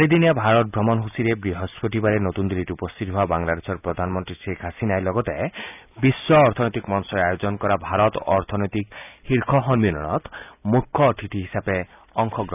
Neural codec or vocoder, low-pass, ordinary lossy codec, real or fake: none; 3.6 kHz; none; real